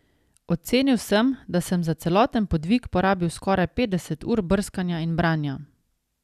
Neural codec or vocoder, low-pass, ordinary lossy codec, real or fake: none; 14.4 kHz; none; real